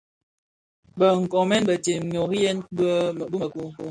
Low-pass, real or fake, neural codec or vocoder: 9.9 kHz; real; none